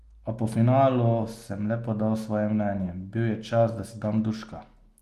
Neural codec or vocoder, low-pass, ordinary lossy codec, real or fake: none; 14.4 kHz; Opus, 24 kbps; real